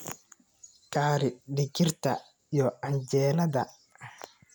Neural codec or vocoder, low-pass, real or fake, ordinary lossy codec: none; none; real; none